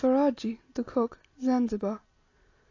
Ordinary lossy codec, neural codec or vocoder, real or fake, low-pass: AAC, 32 kbps; none; real; 7.2 kHz